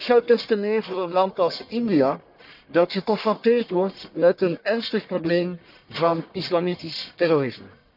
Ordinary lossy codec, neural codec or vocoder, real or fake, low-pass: none; codec, 44.1 kHz, 1.7 kbps, Pupu-Codec; fake; 5.4 kHz